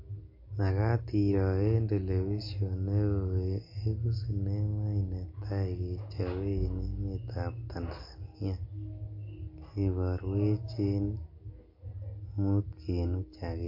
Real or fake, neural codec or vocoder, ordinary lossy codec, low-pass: real; none; MP3, 32 kbps; 5.4 kHz